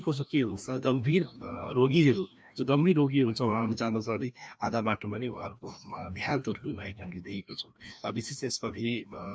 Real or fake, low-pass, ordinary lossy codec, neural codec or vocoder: fake; none; none; codec, 16 kHz, 1 kbps, FreqCodec, larger model